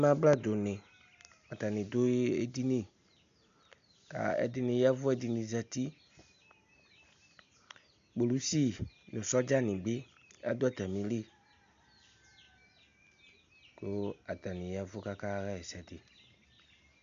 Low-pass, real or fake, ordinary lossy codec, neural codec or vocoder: 7.2 kHz; real; MP3, 64 kbps; none